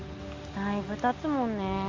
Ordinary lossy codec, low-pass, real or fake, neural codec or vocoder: Opus, 32 kbps; 7.2 kHz; real; none